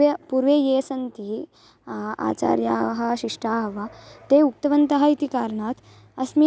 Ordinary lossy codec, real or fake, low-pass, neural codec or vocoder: none; real; none; none